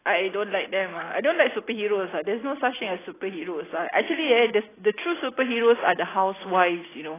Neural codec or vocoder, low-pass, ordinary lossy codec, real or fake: none; 3.6 kHz; AAC, 16 kbps; real